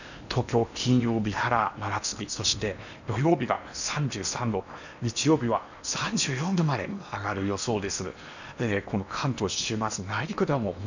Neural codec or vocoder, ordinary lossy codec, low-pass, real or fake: codec, 16 kHz in and 24 kHz out, 0.8 kbps, FocalCodec, streaming, 65536 codes; none; 7.2 kHz; fake